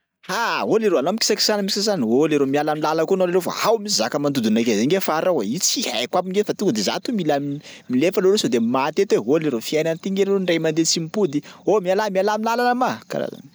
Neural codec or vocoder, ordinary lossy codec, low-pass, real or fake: none; none; none; real